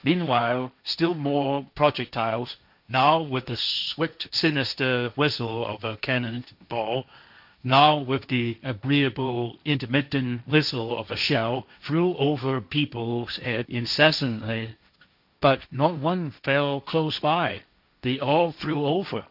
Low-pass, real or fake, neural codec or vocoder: 5.4 kHz; fake; codec, 16 kHz, 1.1 kbps, Voila-Tokenizer